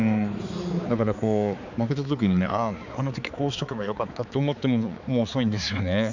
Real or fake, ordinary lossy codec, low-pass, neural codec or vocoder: fake; none; 7.2 kHz; codec, 16 kHz, 4 kbps, X-Codec, HuBERT features, trained on balanced general audio